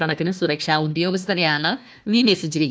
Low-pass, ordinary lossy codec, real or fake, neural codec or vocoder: none; none; fake; codec, 16 kHz, 1 kbps, FunCodec, trained on Chinese and English, 50 frames a second